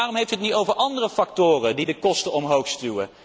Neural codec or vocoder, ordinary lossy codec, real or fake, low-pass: none; none; real; none